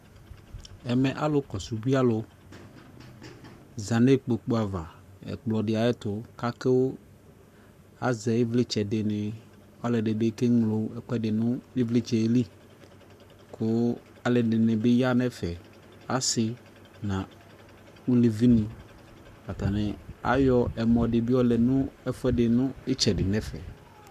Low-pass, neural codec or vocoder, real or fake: 14.4 kHz; codec, 44.1 kHz, 7.8 kbps, Pupu-Codec; fake